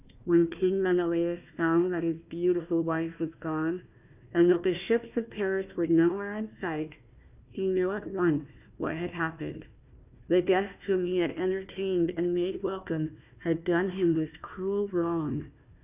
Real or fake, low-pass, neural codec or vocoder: fake; 3.6 kHz; codec, 16 kHz, 1 kbps, FunCodec, trained on Chinese and English, 50 frames a second